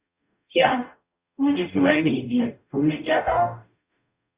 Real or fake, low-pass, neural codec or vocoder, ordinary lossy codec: fake; 3.6 kHz; codec, 44.1 kHz, 0.9 kbps, DAC; Opus, 64 kbps